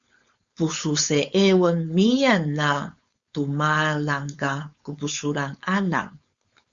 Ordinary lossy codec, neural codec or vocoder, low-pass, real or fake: Opus, 64 kbps; codec, 16 kHz, 4.8 kbps, FACodec; 7.2 kHz; fake